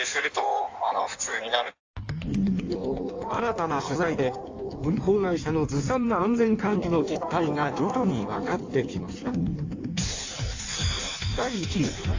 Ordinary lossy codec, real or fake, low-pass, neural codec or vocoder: AAC, 48 kbps; fake; 7.2 kHz; codec, 16 kHz in and 24 kHz out, 1.1 kbps, FireRedTTS-2 codec